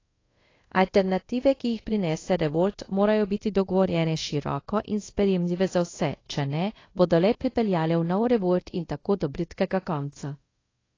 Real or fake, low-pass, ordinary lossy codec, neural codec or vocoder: fake; 7.2 kHz; AAC, 32 kbps; codec, 24 kHz, 0.5 kbps, DualCodec